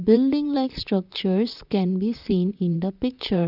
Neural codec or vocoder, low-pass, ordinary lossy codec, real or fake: vocoder, 44.1 kHz, 128 mel bands, Pupu-Vocoder; 5.4 kHz; none; fake